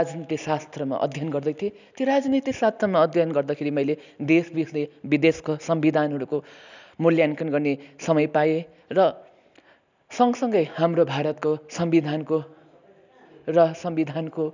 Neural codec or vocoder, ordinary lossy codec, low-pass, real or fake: none; none; 7.2 kHz; real